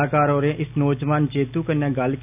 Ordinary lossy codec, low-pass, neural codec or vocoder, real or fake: none; 3.6 kHz; none; real